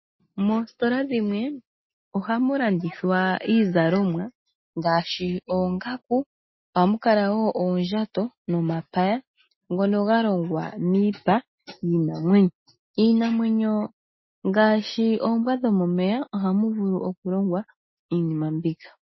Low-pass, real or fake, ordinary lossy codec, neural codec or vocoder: 7.2 kHz; real; MP3, 24 kbps; none